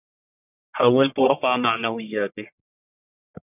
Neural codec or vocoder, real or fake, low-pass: codec, 44.1 kHz, 1.7 kbps, Pupu-Codec; fake; 3.6 kHz